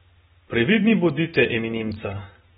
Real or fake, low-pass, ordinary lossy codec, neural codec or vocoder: real; 19.8 kHz; AAC, 16 kbps; none